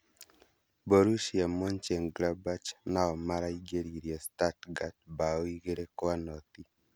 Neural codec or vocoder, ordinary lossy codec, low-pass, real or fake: none; none; none; real